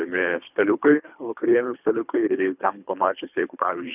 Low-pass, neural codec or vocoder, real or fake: 3.6 kHz; codec, 24 kHz, 3 kbps, HILCodec; fake